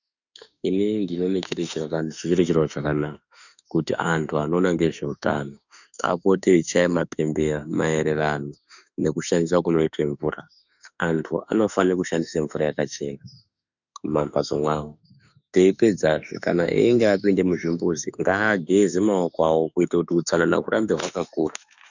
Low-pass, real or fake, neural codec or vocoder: 7.2 kHz; fake; autoencoder, 48 kHz, 32 numbers a frame, DAC-VAE, trained on Japanese speech